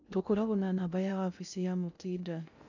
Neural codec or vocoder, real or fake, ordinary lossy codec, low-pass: codec, 16 kHz in and 24 kHz out, 0.6 kbps, FocalCodec, streaming, 2048 codes; fake; none; 7.2 kHz